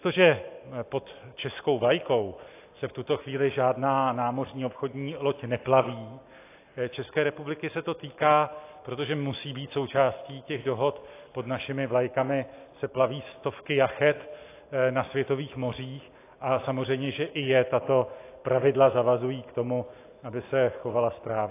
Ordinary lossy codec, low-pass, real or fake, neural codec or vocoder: AAC, 24 kbps; 3.6 kHz; real; none